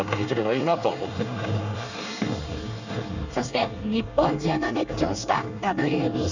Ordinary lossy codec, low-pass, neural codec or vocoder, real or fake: none; 7.2 kHz; codec, 24 kHz, 1 kbps, SNAC; fake